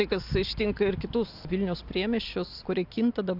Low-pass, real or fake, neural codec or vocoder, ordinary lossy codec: 5.4 kHz; real; none; AAC, 48 kbps